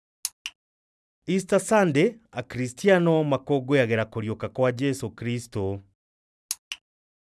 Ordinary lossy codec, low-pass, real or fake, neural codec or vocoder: none; none; real; none